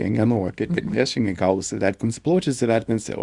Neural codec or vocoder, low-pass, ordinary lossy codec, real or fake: codec, 24 kHz, 0.9 kbps, WavTokenizer, small release; 10.8 kHz; Opus, 64 kbps; fake